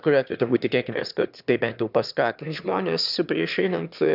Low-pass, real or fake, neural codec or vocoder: 5.4 kHz; fake; autoencoder, 22.05 kHz, a latent of 192 numbers a frame, VITS, trained on one speaker